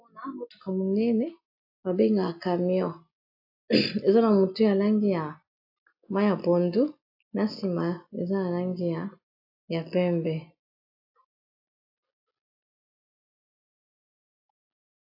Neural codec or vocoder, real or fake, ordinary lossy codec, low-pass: none; real; AAC, 48 kbps; 5.4 kHz